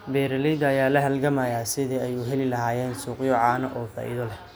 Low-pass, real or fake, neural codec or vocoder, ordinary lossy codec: none; real; none; none